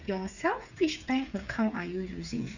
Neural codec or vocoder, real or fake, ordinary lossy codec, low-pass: codec, 16 kHz, 8 kbps, FreqCodec, smaller model; fake; none; 7.2 kHz